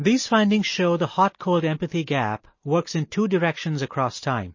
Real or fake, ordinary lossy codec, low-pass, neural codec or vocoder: real; MP3, 32 kbps; 7.2 kHz; none